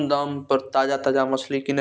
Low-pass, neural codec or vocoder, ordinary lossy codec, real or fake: none; none; none; real